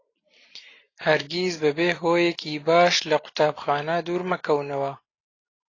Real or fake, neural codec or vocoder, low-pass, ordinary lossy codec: real; none; 7.2 kHz; AAC, 32 kbps